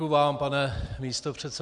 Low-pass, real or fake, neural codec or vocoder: 10.8 kHz; real; none